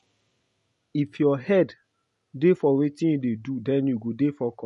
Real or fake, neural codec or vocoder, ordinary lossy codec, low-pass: real; none; MP3, 48 kbps; 14.4 kHz